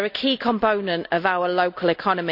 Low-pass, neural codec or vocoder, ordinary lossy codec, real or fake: 5.4 kHz; none; none; real